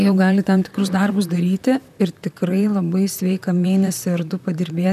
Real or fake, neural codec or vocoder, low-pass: fake; vocoder, 44.1 kHz, 128 mel bands, Pupu-Vocoder; 14.4 kHz